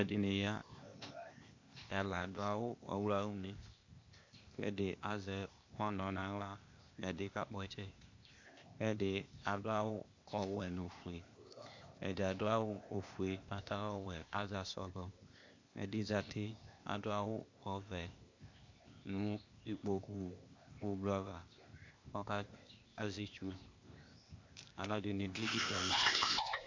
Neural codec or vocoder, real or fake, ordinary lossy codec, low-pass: codec, 16 kHz, 0.8 kbps, ZipCodec; fake; MP3, 48 kbps; 7.2 kHz